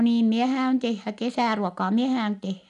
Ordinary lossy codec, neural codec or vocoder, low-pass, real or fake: none; none; 10.8 kHz; real